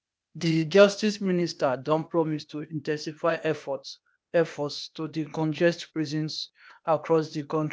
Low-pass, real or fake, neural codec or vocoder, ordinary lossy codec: none; fake; codec, 16 kHz, 0.8 kbps, ZipCodec; none